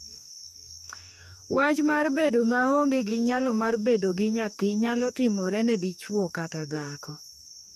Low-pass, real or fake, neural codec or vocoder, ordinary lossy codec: 14.4 kHz; fake; codec, 44.1 kHz, 2.6 kbps, DAC; none